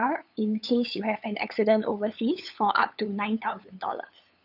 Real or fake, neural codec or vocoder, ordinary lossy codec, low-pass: fake; codec, 16 kHz, 8 kbps, FunCodec, trained on LibriTTS, 25 frames a second; none; 5.4 kHz